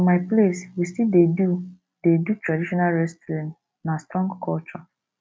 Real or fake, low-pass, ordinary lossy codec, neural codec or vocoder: real; none; none; none